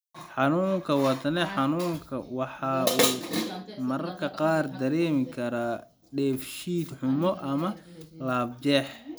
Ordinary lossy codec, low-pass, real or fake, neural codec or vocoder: none; none; real; none